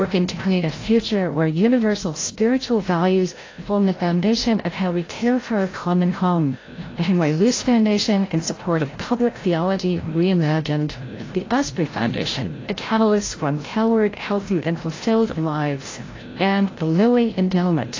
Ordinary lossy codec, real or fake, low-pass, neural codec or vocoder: AAC, 32 kbps; fake; 7.2 kHz; codec, 16 kHz, 0.5 kbps, FreqCodec, larger model